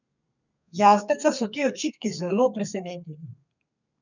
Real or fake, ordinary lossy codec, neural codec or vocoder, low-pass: fake; none; codec, 32 kHz, 1.9 kbps, SNAC; 7.2 kHz